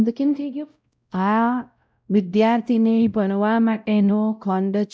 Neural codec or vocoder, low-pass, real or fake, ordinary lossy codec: codec, 16 kHz, 0.5 kbps, X-Codec, WavLM features, trained on Multilingual LibriSpeech; none; fake; none